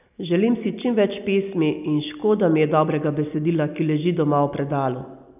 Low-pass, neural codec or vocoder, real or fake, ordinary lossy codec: 3.6 kHz; none; real; none